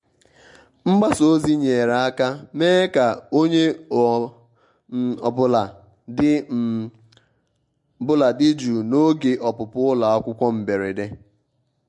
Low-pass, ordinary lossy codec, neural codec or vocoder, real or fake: 10.8 kHz; MP3, 48 kbps; none; real